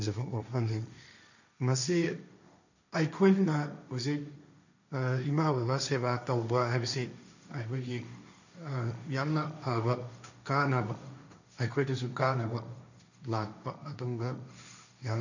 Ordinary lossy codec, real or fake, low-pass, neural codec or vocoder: none; fake; 7.2 kHz; codec, 16 kHz, 1.1 kbps, Voila-Tokenizer